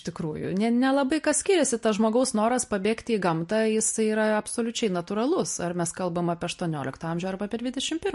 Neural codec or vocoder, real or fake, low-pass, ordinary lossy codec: none; real; 14.4 kHz; MP3, 48 kbps